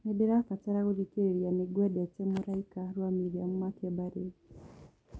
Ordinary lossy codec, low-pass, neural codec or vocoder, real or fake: none; none; none; real